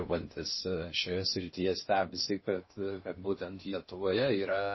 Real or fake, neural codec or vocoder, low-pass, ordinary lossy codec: fake; codec, 16 kHz in and 24 kHz out, 0.6 kbps, FocalCodec, streaming, 4096 codes; 7.2 kHz; MP3, 24 kbps